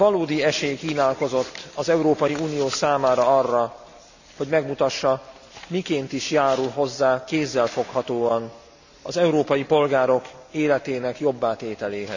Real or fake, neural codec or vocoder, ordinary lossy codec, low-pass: real; none; none; 7.2 kHz